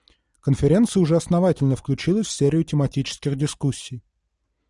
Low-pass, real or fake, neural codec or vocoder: 10.8 kHz; real; none